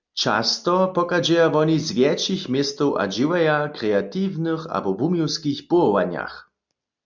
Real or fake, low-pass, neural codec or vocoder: real; 7.2 kHz; none